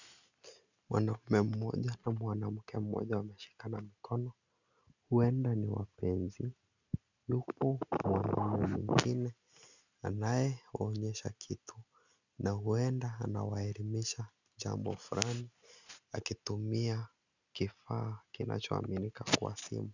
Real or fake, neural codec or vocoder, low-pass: real; none; 7.2 kHz